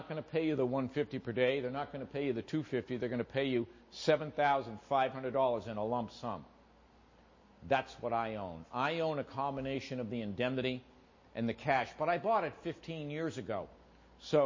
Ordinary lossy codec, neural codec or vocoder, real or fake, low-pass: MP3, 32 kbps; none; real; 7.2 kHz